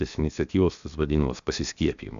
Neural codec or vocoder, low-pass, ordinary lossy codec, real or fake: codec, 16 kHz, 0.7 kbps, FocalCodec; 7.2 kHz; AAC, 96 kbps; fake